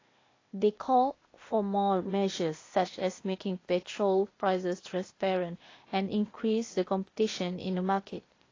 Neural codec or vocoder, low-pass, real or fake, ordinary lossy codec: codec, 16 kHz, 0.8 kbps, ZipCodec; 7.2 kHz; fake; AAC, 32 kbps